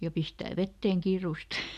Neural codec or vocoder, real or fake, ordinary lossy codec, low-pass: none; real; none; 14.4 kHz